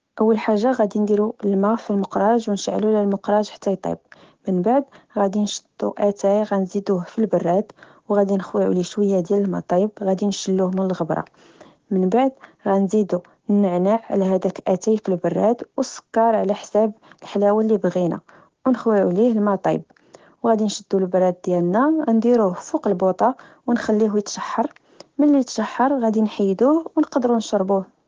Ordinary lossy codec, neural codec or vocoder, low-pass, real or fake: Opus, 16 kbps; codec, 16 kHz, 6 kbps, DAC; 7.2 kHz; fake